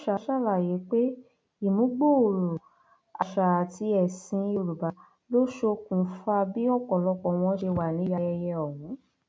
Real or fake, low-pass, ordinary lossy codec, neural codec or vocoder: real; none; none; none